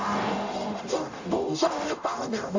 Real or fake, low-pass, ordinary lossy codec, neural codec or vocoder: fake; 7.2 kHz; AAC, 48 kbps; codec, 44.1 kHz, 0.9 kbps, DAC